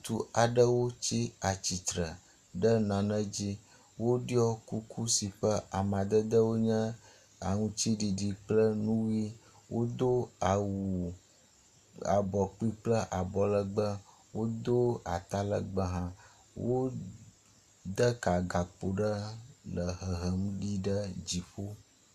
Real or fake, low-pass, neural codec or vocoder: real; 14.4 kHz; none